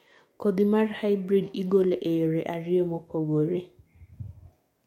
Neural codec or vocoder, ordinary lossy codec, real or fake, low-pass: codec, 44.1 kHz, 7.8 kbps, DAC; MP3, 64 kbps; fake; 19.8 kHz